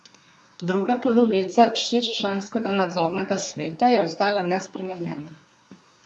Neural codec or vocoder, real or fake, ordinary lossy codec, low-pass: codec, 24 kHz, 1 kbps, SNAC; fake; none; none